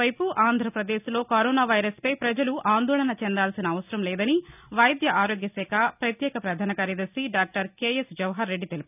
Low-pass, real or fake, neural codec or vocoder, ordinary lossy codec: 3.6 kHz; real; none; none